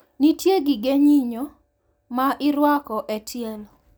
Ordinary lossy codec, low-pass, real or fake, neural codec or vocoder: none; none; real; none